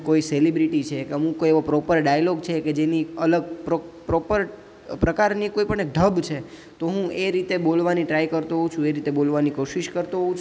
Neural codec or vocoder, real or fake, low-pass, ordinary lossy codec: none; real; none; none